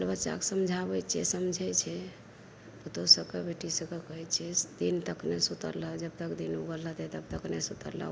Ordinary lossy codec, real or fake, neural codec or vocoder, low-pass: none; real; none; none